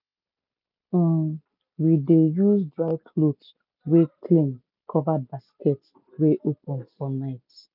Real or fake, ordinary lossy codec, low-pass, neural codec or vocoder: real; none; 5.4 kHz; none